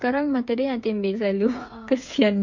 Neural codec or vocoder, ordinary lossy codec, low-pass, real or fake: codec, 16 kHz, 8 kbps, FreqCodec, smaller model; MP3, 48 kbps; 7.2 kHz; fake